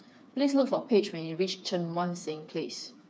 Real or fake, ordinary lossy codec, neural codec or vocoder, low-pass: fake; none; codec, 16 kHz, 4 kbps, FreqCodec, smaller model; none